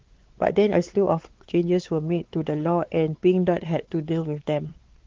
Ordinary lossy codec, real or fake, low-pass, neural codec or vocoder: Opus, 16 kbps; fake; 7.2 kHz; codec, 16 kHz, 4 kbps, X-Codec, WavLM features, trained on Multilingual LibriSpeech